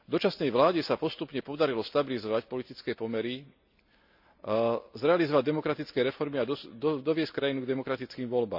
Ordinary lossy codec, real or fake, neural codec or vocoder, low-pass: none; real; none; 5.4 kHz